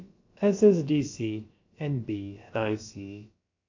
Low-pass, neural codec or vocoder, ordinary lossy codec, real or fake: 7.2 kHz; codec, 16 kHz, about 1 kbps, DyCAST, with the encoder's durations; AAC, 48 kbps; fake